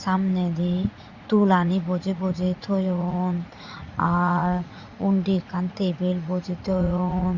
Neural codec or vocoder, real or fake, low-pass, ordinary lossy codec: vocoder, 44.1 kHz, 80 mel bands, Vocos; fake; 7.2 kHz; Opus, 64 kbps